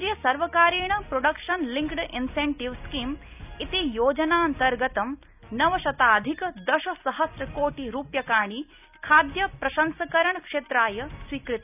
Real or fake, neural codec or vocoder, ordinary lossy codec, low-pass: real; none; none; 3.6 kHz